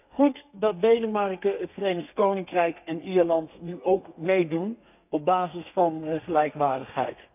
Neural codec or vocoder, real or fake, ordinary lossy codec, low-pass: codec, 32 kHz, 1.9 kbps, SNAC; fake; none; 3.6 kHz